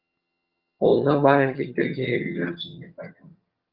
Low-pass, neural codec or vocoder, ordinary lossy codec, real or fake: 5.4 kHz; vocoder, 22.05 kHz, 80 mel bands, HiFi-GAN; Opus, 24 kbps; fake